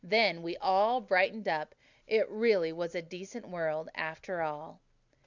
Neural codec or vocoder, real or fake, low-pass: none; real; 7.2 kHz